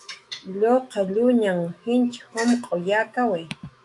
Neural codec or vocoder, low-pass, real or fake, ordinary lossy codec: autoencoder, 48 kHz, 128 numbers a frame, DAC-VAE, trained on Japanese speech; 10.8 kHz; fake; AAC, 64 kbps